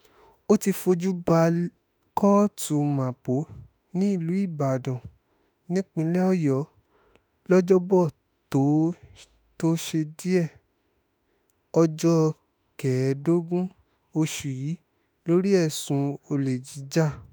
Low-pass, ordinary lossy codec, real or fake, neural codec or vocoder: none; none; fake; autoencoder, 48 kHz, 32 numbers a frame, DAC-VAE, trained on Japanese speech